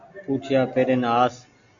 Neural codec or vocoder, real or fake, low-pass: none; real; 7.2 kHz